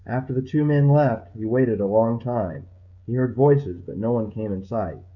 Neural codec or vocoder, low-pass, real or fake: codec, 16 kHz, 16 kbps, FreqCodec, smaller model; 7.2 kHz; fake